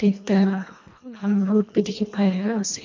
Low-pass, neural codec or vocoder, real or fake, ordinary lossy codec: 7.2 kHz; codec, 24 kHz, 1.5 kbps, HILCodec; fake; MP3, 48 kbps